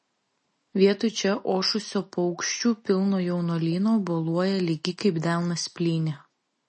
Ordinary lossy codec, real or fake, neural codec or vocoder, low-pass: MP3, 32 kbps; real; none; 10.8 kHz